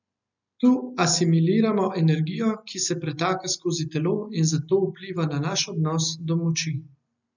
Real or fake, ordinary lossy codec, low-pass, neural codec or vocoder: real; none; 7.2 kHz; none